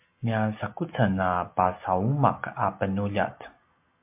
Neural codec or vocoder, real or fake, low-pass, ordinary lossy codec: none; real; 3.6 kHz; MP3, 32 kbps